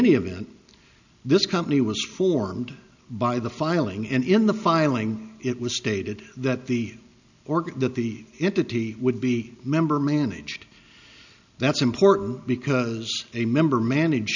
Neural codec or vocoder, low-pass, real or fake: none; 7.2 kHz; real